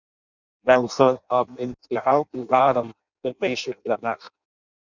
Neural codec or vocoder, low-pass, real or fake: codec, 16 kHz in and 24 kHz out, 0.6 kbps, FireRedTTS-2 codec; 7.2 kHz; fake